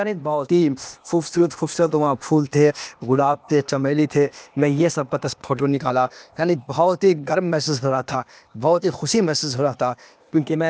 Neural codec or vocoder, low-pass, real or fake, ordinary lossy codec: codec, 16 kHz, 0.8 kbps, ZipCodec; none; fake; none